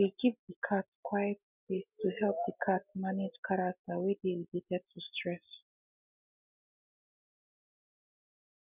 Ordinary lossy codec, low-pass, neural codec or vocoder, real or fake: none; 3.6 kHz; none; real